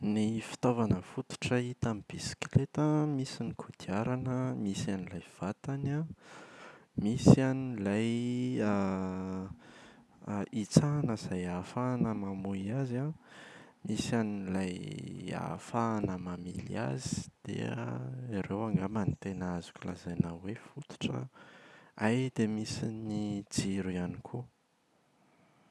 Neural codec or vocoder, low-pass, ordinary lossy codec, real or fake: none; none; none; real